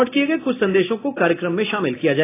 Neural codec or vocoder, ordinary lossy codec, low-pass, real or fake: none; AAC, 24 kbps; 3.6 kHz; real